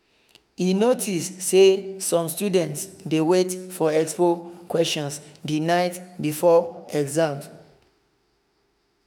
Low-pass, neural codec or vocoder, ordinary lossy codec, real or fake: none; autoencoder, 48 kHz, 32 numbers a frame, DAC-VAE, trained on Japanese speech; none; fake